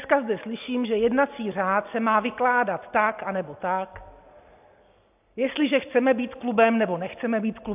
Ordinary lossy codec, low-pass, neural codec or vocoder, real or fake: AAC, 32 kbps; 3.6 kHz; none; real